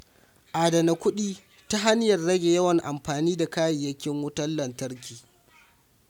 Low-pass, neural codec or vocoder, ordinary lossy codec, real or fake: 19.8 kHz; none; none; real